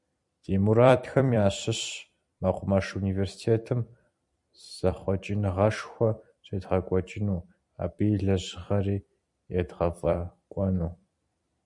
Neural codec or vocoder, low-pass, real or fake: vocoder, 44.1 kHz, 128 mel bands every 256 samples, BigVGAN v2; 10.8 kHz; fake